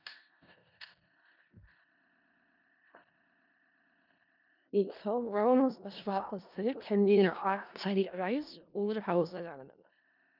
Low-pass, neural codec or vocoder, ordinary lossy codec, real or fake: 5.4 kHz; codec, 16 kHz in and 24 kHz out, 0.4 kbps, LongCat-Audio-Codec, four codebook decoder; none; fake